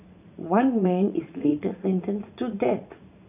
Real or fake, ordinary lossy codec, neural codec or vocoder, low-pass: fake; none; vocoder, 44.1 kHz, 80 mel bands, Vocos; 3.6 kHz